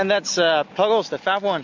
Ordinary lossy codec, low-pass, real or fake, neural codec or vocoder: MP3, 48 kbps; 7.2 kHz; real; none